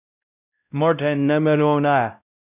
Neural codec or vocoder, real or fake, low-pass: codec, 16 kHz, 0.5 kbps, X-Codec, WavLM features, trained on Multilingual LibriSpeech; fake; 3.6 kHz